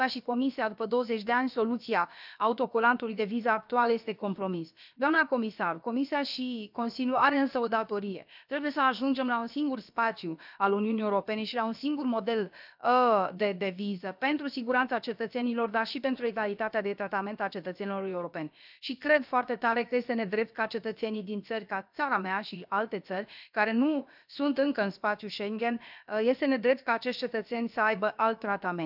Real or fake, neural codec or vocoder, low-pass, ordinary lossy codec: fake; codec, 16 kHz, about 1 kbps, DyCAST, with the encoder's durations; 5.4 kHz; none